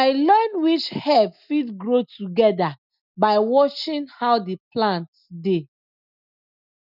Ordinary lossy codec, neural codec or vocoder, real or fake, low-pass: none; none; real; 5.4 kHz